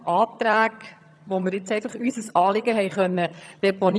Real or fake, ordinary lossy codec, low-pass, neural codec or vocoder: fake; none; none; vocoder, 22.05 kHz, 80 mel bands, HiFi-GAN